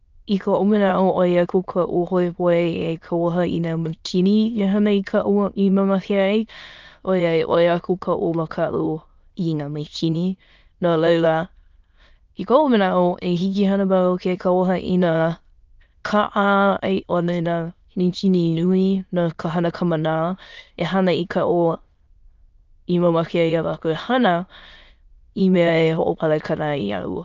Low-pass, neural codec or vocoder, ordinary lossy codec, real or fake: 7.2 kHz; autoencoder, 22.05 kHz, a latent of 192 numbers a frame, VITS, trained on many speakers; Opus, 24 kbps; fake